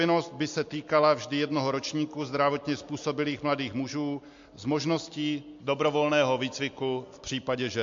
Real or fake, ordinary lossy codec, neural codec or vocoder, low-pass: real; MP3, 48 kbps; none; 7.2 kHz